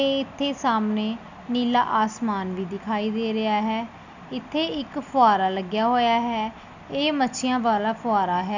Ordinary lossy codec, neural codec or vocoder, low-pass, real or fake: none; none; 7.2 kHz; real